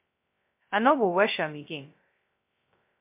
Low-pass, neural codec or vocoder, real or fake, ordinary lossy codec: 3.6 kHz; codec, 16 kHz, 0.2 kbps, FocalCodec; fake; MP3, 32 kbps